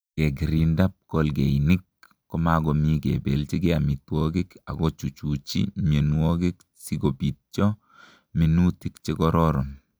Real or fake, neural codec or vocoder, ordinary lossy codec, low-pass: real; none; none; none